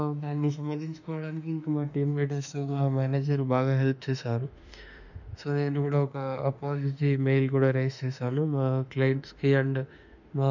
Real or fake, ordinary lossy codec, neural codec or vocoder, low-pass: fake; none; autoencoder, 48 kHz, 32 numbers a frame, DAC-VAE, trained on Japanese speech; 7.2 kHz